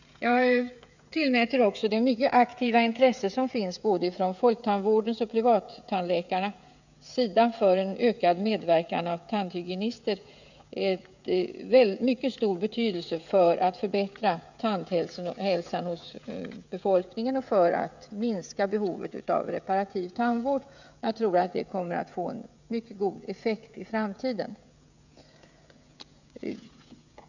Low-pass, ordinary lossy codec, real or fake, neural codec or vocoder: 7.2 kHz; none; fake; codec, 16 kHz, 16 kbps, FreqCodec, smaller model